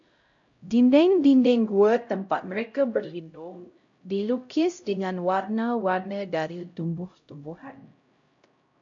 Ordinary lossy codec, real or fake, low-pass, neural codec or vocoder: MP3, 48 kbps; fake; 7.2 kHz; codec, 16 kHz, 0.5 kbps, X-Codec, HuBERT features, trained on LibriSpeech